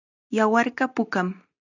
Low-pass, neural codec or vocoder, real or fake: 7.2 kHz; none; real